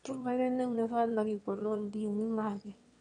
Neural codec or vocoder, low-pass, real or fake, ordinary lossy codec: autoencoder, 22.05 kHz, a latent of 192 numbers a frame, VITS, trained on one speaker; 9.9 kHz; fake; MP3, 64 kbps